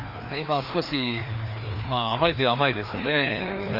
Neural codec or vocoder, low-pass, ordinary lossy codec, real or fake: codec, 16 kHz, 2 kbps, FreqCodec, larger model; 5.4 kHz; Opus, 64 kbps; fake